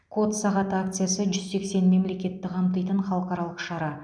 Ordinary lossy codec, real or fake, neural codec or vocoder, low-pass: none; real; none; 9.9 kHz